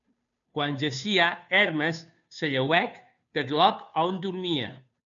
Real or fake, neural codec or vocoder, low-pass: fake; codec, 16 kHz, 2 kbps, FunCodec, trained on Chinese and English, 25 frames a second; 7.2 kHz